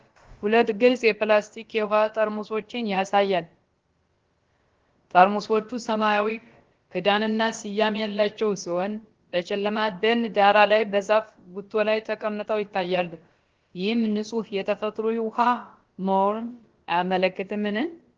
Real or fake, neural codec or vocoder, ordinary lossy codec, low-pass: fake; codec, 16 kHz, about 1 kbps, DyCAST, with the encoder's durations; Opus, 16 kbps; 7.2 kHz